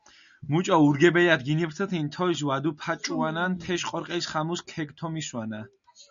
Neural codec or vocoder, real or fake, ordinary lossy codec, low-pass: none; real; MP3, 96 kbps; 7.2 kHz